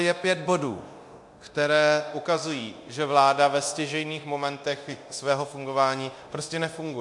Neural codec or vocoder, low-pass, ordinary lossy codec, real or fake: codec, 24 kHz, 0.9 kbps, DualCodec; 10.8 kHz; MP3, 64 kbps; fake